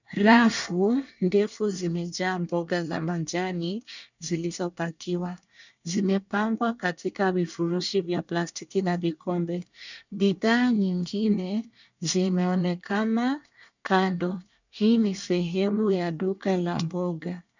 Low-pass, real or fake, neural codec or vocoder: 7.2 kHz; fake; codec, 24 kHz, 1 kbps, SNAC